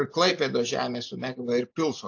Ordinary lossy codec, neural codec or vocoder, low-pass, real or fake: AAC, 48 kbps; none; 7.2 kHz; real